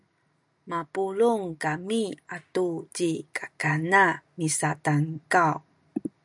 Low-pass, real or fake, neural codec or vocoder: 10.8 kHz; real; none